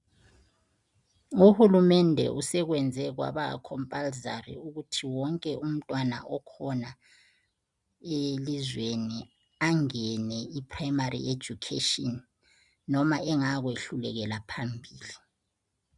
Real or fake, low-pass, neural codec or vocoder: real; 10.8 kHz; none